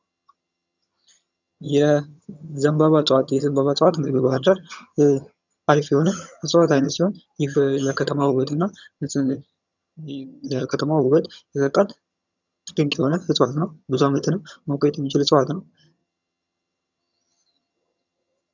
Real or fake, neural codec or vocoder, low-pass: fake; vocoder, 22.05 kHz, 80 mel bands, HiFi-GAN; 7.2 kHz